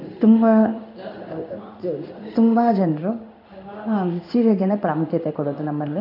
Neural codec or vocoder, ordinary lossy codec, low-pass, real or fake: codec, 16 kHz in and 24 kHz out, 1 kbps, XY-Tokenizer; none; 5.4 kHz; fake